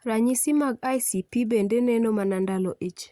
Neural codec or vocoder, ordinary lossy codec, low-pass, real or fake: none; Opus, 64 kbps; 19.8 kHz; real